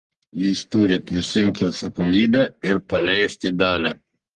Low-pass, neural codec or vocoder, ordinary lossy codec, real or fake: 10.8 kHz; codec, 44.1 kHz, 1.7 kbps, Pupu-Codec; Opus, 24 kbps; fake